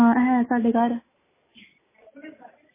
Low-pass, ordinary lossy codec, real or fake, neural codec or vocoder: 3.6 kHz; MP3, 16 kbps; real; none